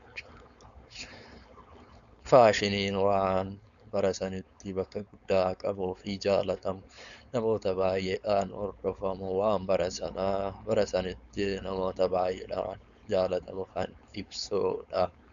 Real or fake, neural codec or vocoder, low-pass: fake; codec, 16 kHz, 4.8 kbps, FACodec; 7.2 kHz